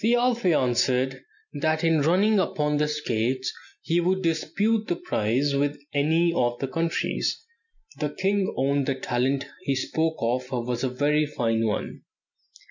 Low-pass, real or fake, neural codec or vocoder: 7.2 kHz; real; none